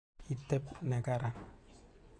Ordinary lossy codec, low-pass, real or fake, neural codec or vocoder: AAC, 64 kbps; 9.9 kHz; fake; vocoder, 24 kHz, 100 mel bands, Vocos